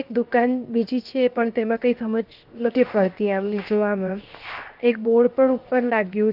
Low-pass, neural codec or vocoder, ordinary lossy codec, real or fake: 5.4 kHz; codec, 16 kHz, 0.7 kbps, FocalCodec; Opus, 32 kbps; fake